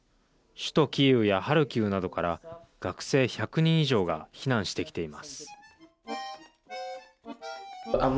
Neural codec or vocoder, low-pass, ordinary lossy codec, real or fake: none; none; none; real